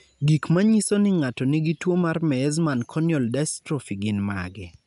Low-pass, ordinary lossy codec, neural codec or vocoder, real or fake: 10.8 kHz; none; none; real